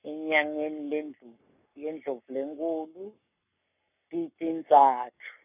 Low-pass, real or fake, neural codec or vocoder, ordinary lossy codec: 3.6 kHz; fake; codec, 44.1 kHz, 7.8 kbps, Pupu-Codec; none